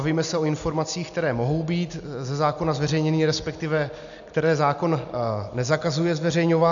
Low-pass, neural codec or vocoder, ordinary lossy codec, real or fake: 7.2 kHz; none; MP3, 96 kbps; real